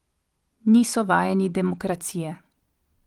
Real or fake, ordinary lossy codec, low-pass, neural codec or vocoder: fake; Opus, 24 kbps; 19.8 kHz; vocoder, 44.1 kHz, 128 mel bands every 256 samples, BigVGAN v2